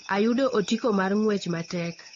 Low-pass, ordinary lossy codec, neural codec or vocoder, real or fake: 7.2 kHz; AAC, 32 kbps; codec, 16 kHz, 8 kbps, FunCodec, trained on Chinese and English, 25 frames a second; fake